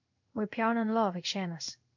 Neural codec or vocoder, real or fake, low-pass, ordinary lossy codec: codec, 16 kHz in and 24 kHz out, 1 kbps, XY-Tokenizer; fake; 7.2 kHz; MP3, 64 kbps